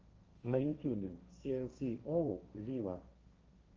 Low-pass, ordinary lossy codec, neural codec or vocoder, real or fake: 7.2 kHz; Opus, 24 kbps; codec, 16 kHz, 1.1 kbps, Voila-Tokenizer; fake